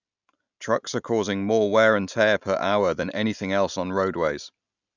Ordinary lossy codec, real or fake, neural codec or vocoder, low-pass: none; real; none; 7.2 kHz